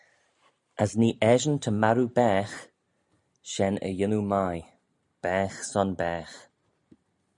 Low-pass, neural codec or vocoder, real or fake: 10.8 kHz; none; real